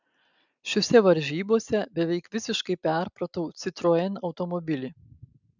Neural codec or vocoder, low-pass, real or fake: none; 7.2 kHz; real